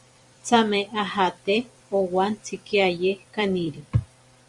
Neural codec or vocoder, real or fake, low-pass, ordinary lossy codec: vocoder, 44.1 kHz, 128 mel bands every 256 samples, BigVGAN v2; fake; 10.8 kHz; Opus, 64 kbps